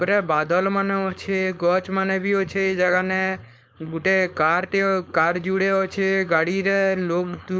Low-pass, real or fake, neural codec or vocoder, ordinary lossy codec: none; fake; codec, 16 kHz, 4.8 kbps, FACodec; none